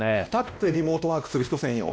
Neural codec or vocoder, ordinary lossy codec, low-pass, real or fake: codec, 16 kHz, 1 kbps, X-Codec, WavLM features, trained on Multilingual LibriSpeech; none; none; fake